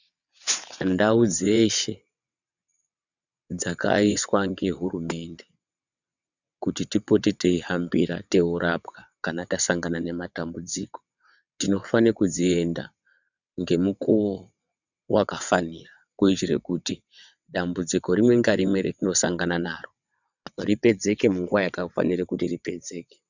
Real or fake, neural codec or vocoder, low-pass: fake; vocoder, 22.05 kHz, 80 mel bands, WaveNeXt; 7.2 kHz